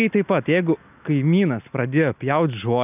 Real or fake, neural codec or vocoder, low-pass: real; none; 3.6 kHz